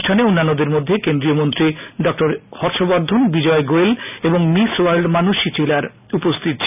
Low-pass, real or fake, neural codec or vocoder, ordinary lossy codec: 3.6 kHz; real; none; none